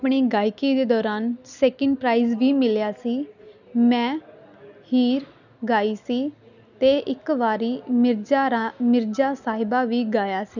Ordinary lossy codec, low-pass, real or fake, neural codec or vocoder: none; 7.2 kHz; real; none